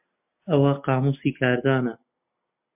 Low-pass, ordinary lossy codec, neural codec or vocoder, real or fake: 3.6 kHz; MP3, 32 kbps; none; real